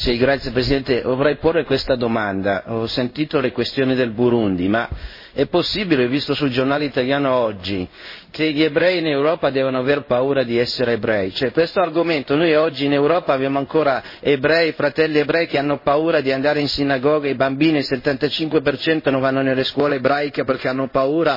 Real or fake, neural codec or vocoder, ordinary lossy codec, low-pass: fake; codec, 16 kHz in and 24 kHz out, 1 kbps, XY-Tokenizer; MP3, 24 kbps; 5.4 kHz